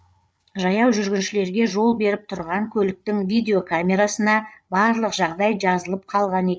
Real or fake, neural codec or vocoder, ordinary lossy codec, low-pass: fake; codec, 16 kHz, 8 kbps, FreqCodec, larger model; none; none